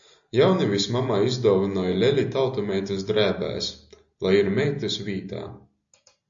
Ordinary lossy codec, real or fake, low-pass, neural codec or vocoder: AAC, 64 kbps; real; 7.2 kHz; none